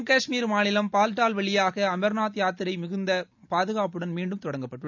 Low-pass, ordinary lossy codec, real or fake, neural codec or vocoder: 7.2 kHz; none; real; none